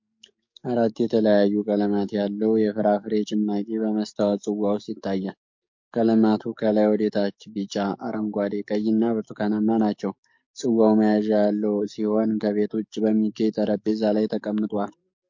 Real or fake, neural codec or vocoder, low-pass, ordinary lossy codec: fake; codec, 44.1 kHz, 7.8 kbps, DAC; 7.2 kHz; MP3, 48 kbps